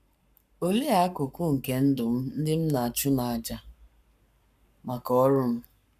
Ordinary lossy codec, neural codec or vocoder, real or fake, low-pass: none; codec, 44.1 kHz, 7.8 kbps, Pupu-Codec; fake; 14.4 kHz